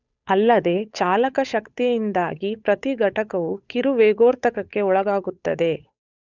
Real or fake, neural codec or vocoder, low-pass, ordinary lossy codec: fake; codec, 16 kHz, 8 kbps, FunCodec, trained on Chinese and English, 25 frames a second; 7.2 kHz; none